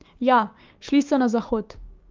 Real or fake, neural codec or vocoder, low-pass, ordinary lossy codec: fake; codec, 16 kHz, 6 kbps, DAC; 7.2 kHz; Opus, 24 kbps